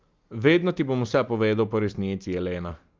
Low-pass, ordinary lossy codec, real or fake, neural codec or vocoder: 7.2 kHz; Opus, 24 kbps; real; none